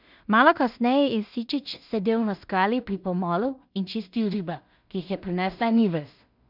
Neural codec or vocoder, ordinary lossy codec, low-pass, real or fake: codec, 16 kHz in and 24 kHz out, 0.4 kbps, LongCat-Audio-Codec, two codebook decoder; none; 5.4 kHz; fake